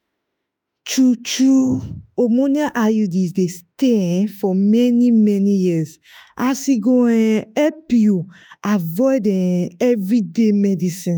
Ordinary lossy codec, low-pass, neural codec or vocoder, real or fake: none; none; autoencoder, 48 kHz, 32 numbers a frame, DAC-VAE, trained on Japanese speech; fake